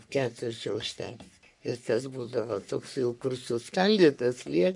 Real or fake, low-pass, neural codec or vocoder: fake; 10.8 kHz; codec, 44.1 kHz, 3.4 kbps, Pupu-Codec